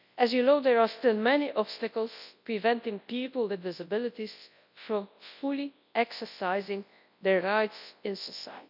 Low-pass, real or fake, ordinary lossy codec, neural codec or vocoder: 5.4 kHz; fake; none; codec, 24 kHz, 0.9 kbps, WavTokenizer, large speech release